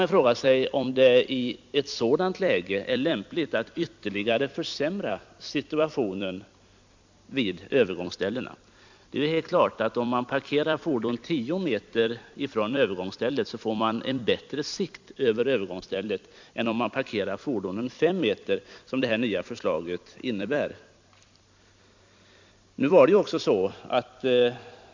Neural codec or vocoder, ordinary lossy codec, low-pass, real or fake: vocoder, 44.1 kHz, 128 mel bands every 256 samples, BigVGAN v2; MP3, 64 kbps; 7.2 kHz; fake